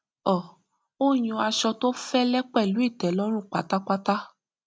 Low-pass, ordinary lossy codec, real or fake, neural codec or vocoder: none; none; real; none